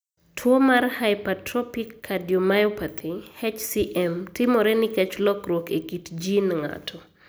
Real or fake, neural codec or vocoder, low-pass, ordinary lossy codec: real; none; none; none